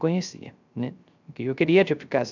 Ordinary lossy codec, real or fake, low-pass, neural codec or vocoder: none; fake; 7.2 kHz; codec, 16 kHz, 0.3 kbps, FocalCodec